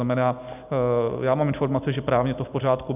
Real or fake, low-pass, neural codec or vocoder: real; 3.6 kHz; none